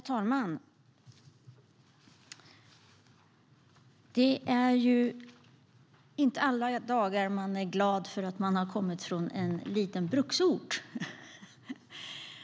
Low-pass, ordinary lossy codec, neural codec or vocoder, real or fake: none; none; none; real